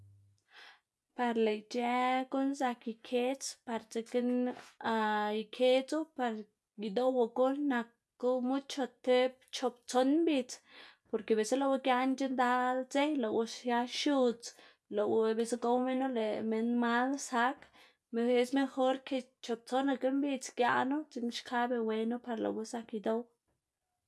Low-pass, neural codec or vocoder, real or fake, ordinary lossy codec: none; none; real; none